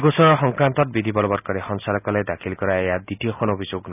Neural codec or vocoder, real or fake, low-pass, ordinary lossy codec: none; real; 3.6 kHz; none